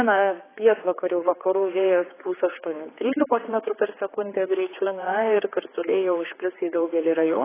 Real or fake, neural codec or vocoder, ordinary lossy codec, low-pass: fake; codec, 16 kHz, 4 kbps, X-Codec, HuBERT features, trained on general audio; AAC, 16 kbps; 3.6 kHz